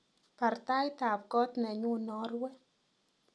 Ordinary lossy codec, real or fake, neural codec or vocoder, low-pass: none; real; none; none